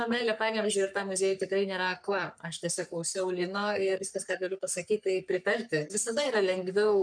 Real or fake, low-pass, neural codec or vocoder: fake; 9.9 kHz; codec, 44.1 kHz, 3.4 kbps, Pupu-Codec